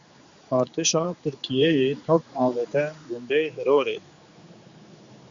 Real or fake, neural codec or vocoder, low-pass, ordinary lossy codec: fake; codec, 16 kHz, 4 kbps, X-Codec, HuBERT features, trained on general audio; 7.2 kHz; Opus, 64 kbps